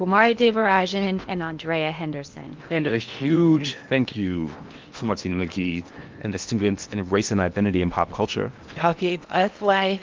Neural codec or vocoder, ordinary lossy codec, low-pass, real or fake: codec, 16 kHz in and 24 kHz out, 0.8 kbps, FocalCodec, streaming, 65536 codes; Opus, 24 kbps; 7.2 kHz; fake